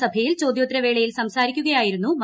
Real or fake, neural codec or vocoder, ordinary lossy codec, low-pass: real; none; none; none